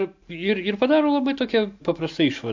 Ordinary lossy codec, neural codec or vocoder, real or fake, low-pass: MP3, 48 kbps; none; real; 7.2 kHz